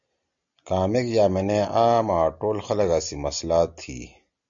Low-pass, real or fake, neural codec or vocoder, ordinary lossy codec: 7.2 kHz; real; none; MP3, 64 kbps